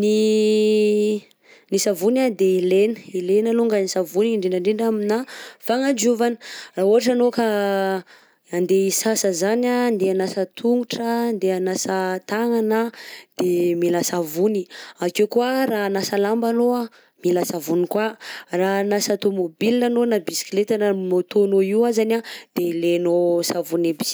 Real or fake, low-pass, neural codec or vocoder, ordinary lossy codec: real; none; none; none